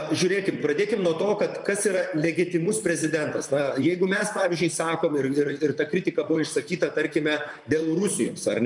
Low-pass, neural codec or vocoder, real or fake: 10.8 kHz; vocoder, 44.1 kHz, 128 mel bands, Pupu-Vocoder; fake